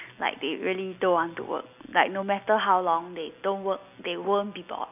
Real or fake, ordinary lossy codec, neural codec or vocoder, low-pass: real; none; none; 3.6 kHz